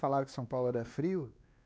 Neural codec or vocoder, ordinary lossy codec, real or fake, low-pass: codec, 16 kHz, 2 kbps, X-Codec, WavLM features, trained on Multilingual LibriSpeech; none; fake; none